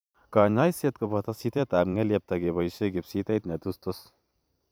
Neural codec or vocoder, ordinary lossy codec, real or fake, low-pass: vocoder, 44.1 kHz, 128 mel bands every 512 samples, BigVGAN v2; none; fake; none